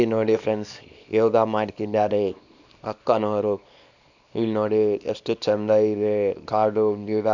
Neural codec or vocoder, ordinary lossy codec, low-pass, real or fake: codec, 24 kHz, 0.9 kbps, WavTokenizer, small release; none; 7.2 kHz; fake